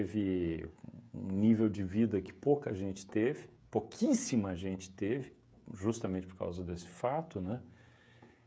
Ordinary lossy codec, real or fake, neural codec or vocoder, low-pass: none; fake; codec, 16 kHz, 16 kbps, FreqCodec, smaller model; none